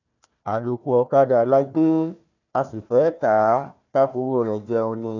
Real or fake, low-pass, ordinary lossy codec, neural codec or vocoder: fake; 7.2 kHz; none; codec, 16 kHz, 1 kbps, FunCodec, trained on Chinese and English, 50 frames a second